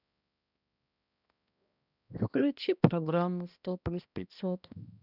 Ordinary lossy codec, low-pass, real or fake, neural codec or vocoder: none; 5.4 kHz; fake; codec, 16 kHz, 1 kbps, X-Codec, HuBERT features, trained on balanced general audio